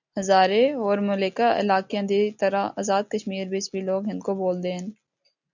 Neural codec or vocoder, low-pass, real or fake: none; 7.2 kHz; real